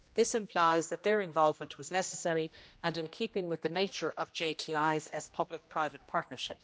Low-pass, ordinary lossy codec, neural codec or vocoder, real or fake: none; none; codec, 16 kHz, 1 kbps, X-Codec, HuBERT features, trained on general audio; fake